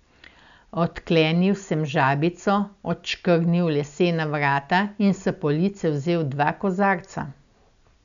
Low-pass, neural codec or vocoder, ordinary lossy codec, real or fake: 7.2 kHz; none; none; real